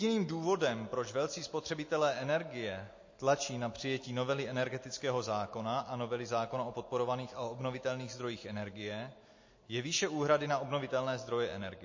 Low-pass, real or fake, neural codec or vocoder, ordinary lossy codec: 7.2 kHz; real; none; MP3, 32 kbps